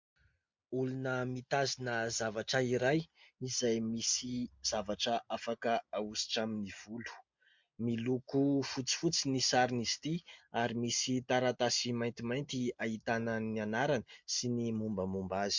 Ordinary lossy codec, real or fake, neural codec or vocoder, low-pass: MP3, 64 kbps; real; none; 7.2 kHz